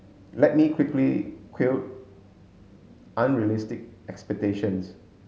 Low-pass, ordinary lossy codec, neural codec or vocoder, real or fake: none; none; none; real